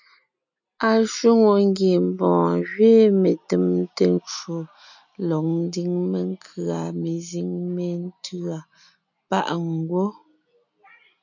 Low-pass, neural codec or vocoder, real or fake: 7.2 kHz; none; real